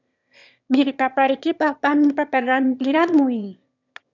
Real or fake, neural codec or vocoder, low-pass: fake; autoencoder, 22.05 kHz, a latent of 192 numbers a frame, VITS, trained on one speaker; 7.2 kHz